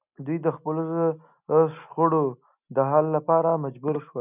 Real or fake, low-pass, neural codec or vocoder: real; 3.6 kHz; none